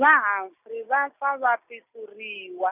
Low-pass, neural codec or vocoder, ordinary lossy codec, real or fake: 3.6 kHz; none; none; real